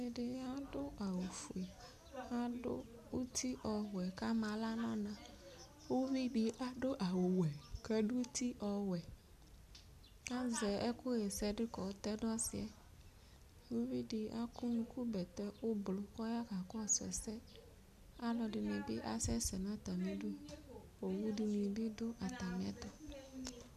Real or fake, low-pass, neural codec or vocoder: real; 14.4 kHz; none